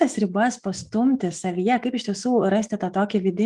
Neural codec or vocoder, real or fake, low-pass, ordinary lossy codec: autoencoder, 48 kHz, 128 numbers a frame, DAC-VAE, trained on Japanese speech; fake; 10.8 kHz; Opus, 24 kbps